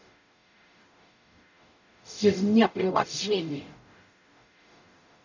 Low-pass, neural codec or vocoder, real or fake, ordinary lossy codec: 7.2 kHz; codec, 44.1 kHz, 0.9 kbps, DAC; fake; none